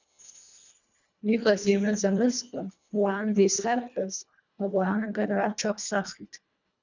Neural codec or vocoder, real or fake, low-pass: codec, 24 kHz, 1.5 kbps, HILCodec; fake; 7.2 kHz